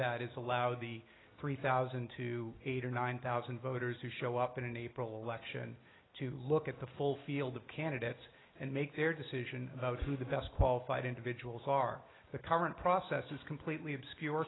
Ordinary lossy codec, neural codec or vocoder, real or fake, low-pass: AAC, 16 kbps; none; real; 7.2 kHz